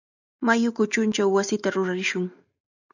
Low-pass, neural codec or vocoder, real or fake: 7.2 kHz; none; real